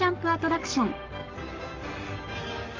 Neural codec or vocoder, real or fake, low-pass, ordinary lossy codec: codec, 44.1 kHz, 7.8 kbps, Pupu-Codec; fake; 7.2 kHz; Opus, 32 kbps